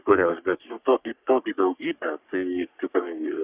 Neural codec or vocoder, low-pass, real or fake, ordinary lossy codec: codec, 44.1 kHz, 3.4 kbps, Pupu-Codec; 3.6 kHz; fake; Opus, 64 kbps